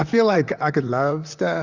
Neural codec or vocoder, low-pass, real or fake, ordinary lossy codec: vocoder, 44.1 kHz, 128 mel bands, Pupu-Vocoder; 7.2 kHz; fake; Opus, 64 kbps